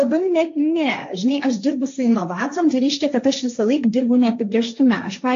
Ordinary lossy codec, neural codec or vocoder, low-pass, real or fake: AAC, 64 kbps; codec, 16 kHz, 1.1 kbps, Voila-Tokenizer; 7.2 kHz; fake